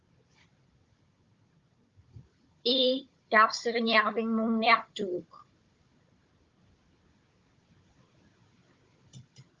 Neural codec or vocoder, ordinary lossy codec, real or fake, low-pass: codec, 16 kHz, 16 kbps, FunCodec, trained on Chinese and English, 50 frames a second; Opus, 32 kbps; fake; 7.2 kHz